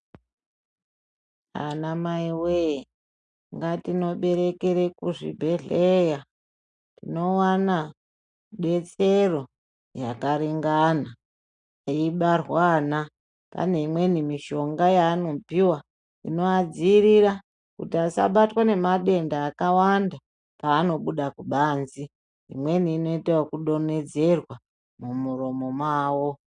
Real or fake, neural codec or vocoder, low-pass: real; none; 9.9 kHz